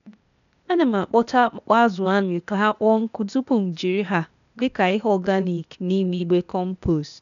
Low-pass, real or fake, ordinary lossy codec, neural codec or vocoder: 7.2 kHz; fake; none; codec, 16 kHz, 0.8 kbps, ZipCodec